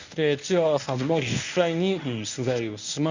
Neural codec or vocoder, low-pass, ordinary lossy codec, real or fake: codec, 24 kHz, 0.9 kbps, WavTokenizer, medium speech release version 1; 7.2 kHz; none; fake